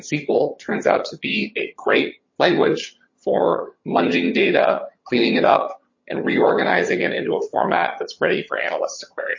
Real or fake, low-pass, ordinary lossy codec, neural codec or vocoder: fake; 7.2 kHz; MP3, 32 kbps; vocoder, 22.05 kHz, 80 mel bands, HiFi-GAN